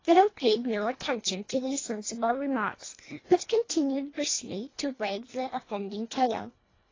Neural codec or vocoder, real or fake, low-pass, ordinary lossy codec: codec, 24 kHz, 1.5 kbps, HILCodec; fake; 7.2 kHz; AAC, 32 kbps